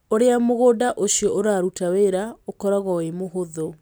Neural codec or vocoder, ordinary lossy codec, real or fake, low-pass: none; none; real; none